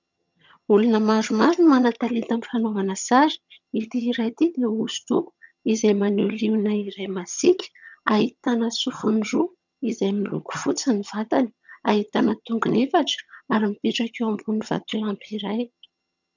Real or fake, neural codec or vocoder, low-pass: fake; vocoder, 22.05 kHz, 80 mel bands, HiFi-GAN; 7.2 kHz